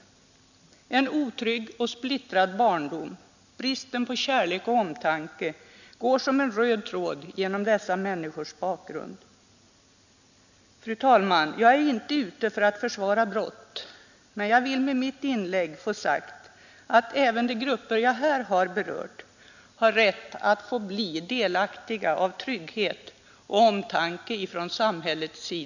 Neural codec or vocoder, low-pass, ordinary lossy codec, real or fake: none; 7.2 kHz; none; real